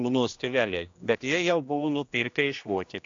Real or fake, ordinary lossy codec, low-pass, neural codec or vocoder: fake; AAC, 48 kbps; 7.2 kHz; codec, 16 kHz, 1 kbps, X-Codec, HuBERT features, trained on general audio